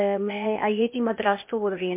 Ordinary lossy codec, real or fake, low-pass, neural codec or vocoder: MP3, 24 kbps; fake; 3.6 kHz; codec, 16 kHz, 0.8 kbps, ZipCodec